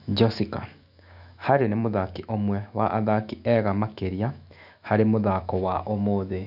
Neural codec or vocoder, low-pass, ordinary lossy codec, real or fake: autoencoder, 48 kHz, 128 numbers a frame, DAC-VAE, trained on Japanese speech; 5.4 kHz; none; fake